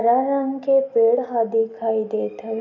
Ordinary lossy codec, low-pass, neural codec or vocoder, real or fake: none; 7.2 kHz; none; real